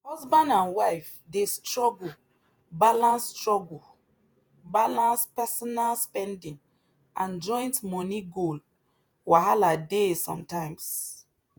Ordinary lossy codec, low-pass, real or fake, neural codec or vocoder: none; none; fake; vocoder, 48 kHz, 128 mel bands, Vocos